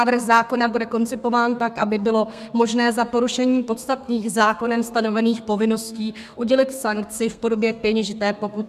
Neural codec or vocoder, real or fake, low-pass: codec, 32 kHz, 1.9 kbps, SNAC; fake; 14.4 kHz